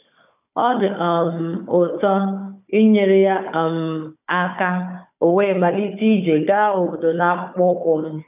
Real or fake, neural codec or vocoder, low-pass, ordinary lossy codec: fake; codec, 16 kHz, 4 kbps, FunCodec, trained on Chinese and English, 50 frames a second; 3.6 kHz; none